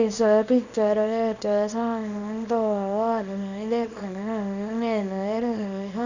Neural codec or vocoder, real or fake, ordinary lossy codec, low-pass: codec, 24 kHz, 0.9 kbps, WavTokenizer, small release; fake; none; 7.2 kHz